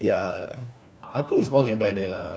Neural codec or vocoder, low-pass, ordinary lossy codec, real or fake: codec, 16 kHz, 1 kbps, FunCodec, trained on LibriTTS, 50 frames a second; none; none; fake